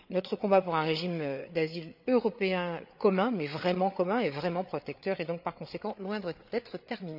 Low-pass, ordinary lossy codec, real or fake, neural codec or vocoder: 5.4 kHz; none; fake; codec, 16 kHz, 8 kbps, FreqCodec, larger model